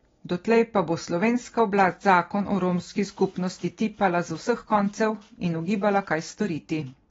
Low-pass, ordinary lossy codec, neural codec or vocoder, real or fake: 7.2 kHz; AAC, 24 kbps; none; real